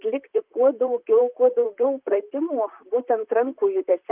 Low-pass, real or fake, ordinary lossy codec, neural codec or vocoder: 3.6 kHz; fake; Opus, 32 kbps; vocoder, 44.1 kHz, 128 mel bands, Pupu-Vocoder